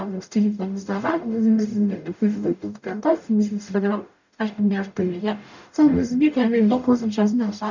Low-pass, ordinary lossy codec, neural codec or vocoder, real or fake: 7.2 kHz; none; codec, 44.1 kHz, 0.9 kbps, DAC; fake